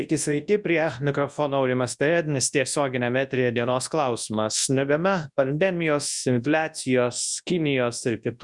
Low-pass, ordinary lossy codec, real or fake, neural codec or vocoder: 10.8 kHz; Opus, 64 kbps; fake; codec, 24 kHz, 0.9 kbps, WavTokenizer, large speech release